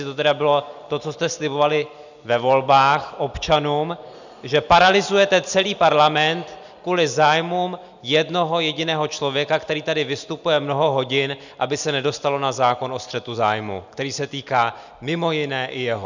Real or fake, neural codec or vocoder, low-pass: real; none; 7.2 kHz